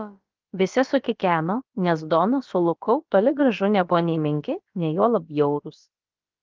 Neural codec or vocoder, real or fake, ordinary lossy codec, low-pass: codec, 16 kHz, about 1 kbps, DyCAST, with the encoder's durations; fake; Opus, 24 kbps; 7.2 kHz